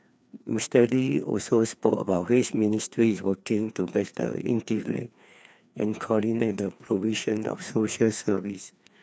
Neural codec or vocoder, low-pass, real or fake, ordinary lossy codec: codec, 16 kHz, 2 kbps, FreqCodec, larger model; none; fake; none